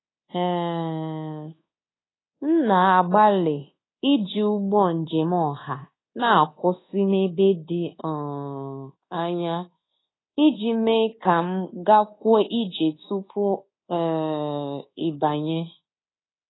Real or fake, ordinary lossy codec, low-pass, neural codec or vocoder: fake; AAC, 16 kbps; 7.2 kHz; codec, 24 kHz, 1.2 kbps, DualCodec